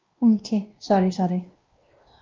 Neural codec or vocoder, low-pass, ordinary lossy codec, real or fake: codec, 16 kHz, 1 kbps, X-Codec, WavLM features, trained on Multilingual LibriSpeech; 7.2 kHz; Opus, 32 kbps; fake